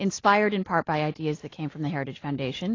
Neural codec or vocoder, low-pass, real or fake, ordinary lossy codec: none; 7.2 kHz; real; AAC, 32 kbps